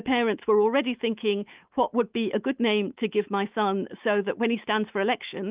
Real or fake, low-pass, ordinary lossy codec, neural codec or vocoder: fake; 3.6 kHz; Opus, 32 kbps; autoencoder, 48 kHz, 128 numbers a frame, DAC-VAE, trained on Japanese speech